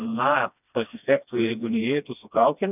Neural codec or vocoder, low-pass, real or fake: codec, 16 kHz, 1 kbps, FreqCodec, smaller model; 3.6 kHz; fake